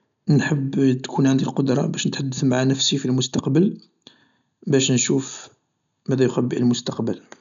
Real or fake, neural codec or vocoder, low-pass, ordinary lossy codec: real; none; 7.2 kHz; none